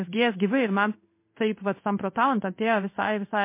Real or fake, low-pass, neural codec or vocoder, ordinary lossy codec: fake; 3.6 kHz; codec, 16 kHz in and 24 kHz out, 1 kbps, XY-Tokenizer; MP3, 24 kbps